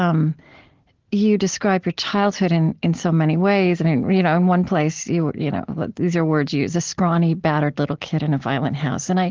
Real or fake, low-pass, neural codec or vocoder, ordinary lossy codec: real; 7.2 kHz; none; Opus, 16 kbps